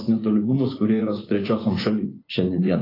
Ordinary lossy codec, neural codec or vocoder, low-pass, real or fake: AAC, 24 kbps; vocoder, 24 kHz, 100 mel bands, Vocos; 5.4 kHz; fake